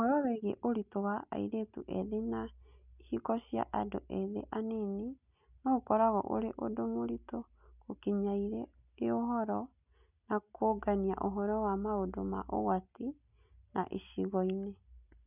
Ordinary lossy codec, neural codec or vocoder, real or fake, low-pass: none; none; real; 3.6 kHz